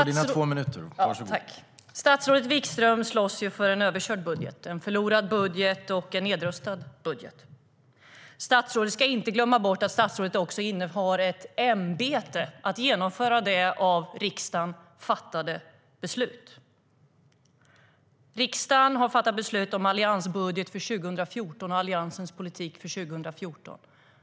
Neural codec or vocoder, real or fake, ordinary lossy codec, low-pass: none; real; none; none